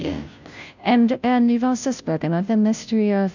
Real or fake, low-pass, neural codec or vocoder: fake; 7.2 kHz; codec, 16 kHz, 0.5 kbps, FunCodec, trained on Chinese and English, 25 frames a second